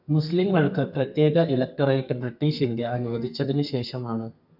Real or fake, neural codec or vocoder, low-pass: fake; codec, 32 kHz, 1.9 kbps, SNAC; 5.4 kHz